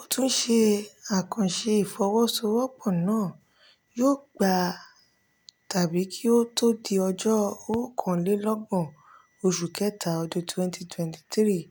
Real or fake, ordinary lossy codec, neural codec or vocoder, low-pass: real; none; none; none